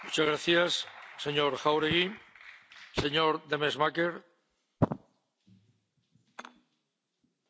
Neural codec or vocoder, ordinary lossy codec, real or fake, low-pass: none; none; real; none